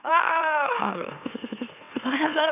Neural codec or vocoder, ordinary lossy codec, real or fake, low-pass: autoencoder, 44.1 kHz, a latent of 192 numbers a frame, MeloTTS; none; fake; 3.6 kHz